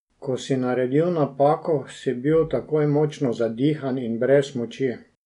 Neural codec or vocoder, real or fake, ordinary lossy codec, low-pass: none; real; none; 10.8 kHz